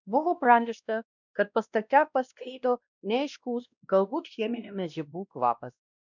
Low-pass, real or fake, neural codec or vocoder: 7.2 kHz; fake; codec, 16 kHz, 1 kbps, X-Codec, WavLM features, trained on Multilingual LibriSpeech